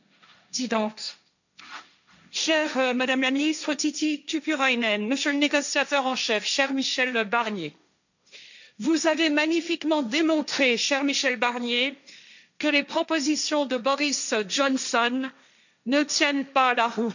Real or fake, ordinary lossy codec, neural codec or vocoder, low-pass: fake; none; codec, 16 kHz, 1.1 kbps, Voila-Tokenizer; 7.2 kHz